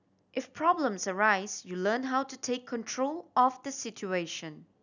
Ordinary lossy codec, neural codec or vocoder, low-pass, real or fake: none; none; 7.2 kHz; real